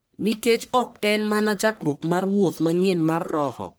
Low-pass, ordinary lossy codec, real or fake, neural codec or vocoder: none; none; fake; codec, 44.1 kHz, 1.7 kbps, Pupu-Codec